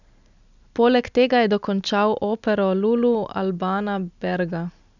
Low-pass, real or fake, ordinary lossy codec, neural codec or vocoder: 7.2 kHz; real; none; none